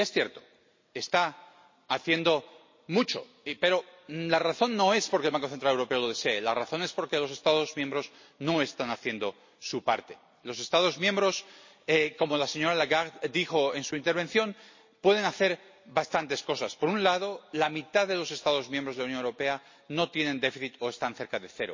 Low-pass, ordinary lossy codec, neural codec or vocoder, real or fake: 7.2 kHz; MP3, 32 kbps; none; real